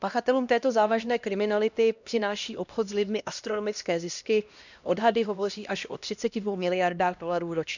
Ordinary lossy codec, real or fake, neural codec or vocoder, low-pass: none; fake; codec, 16 kHz, 1 kbps, X-Codec, HuBERT features, trained on LibriSpeech; 7.2 kHz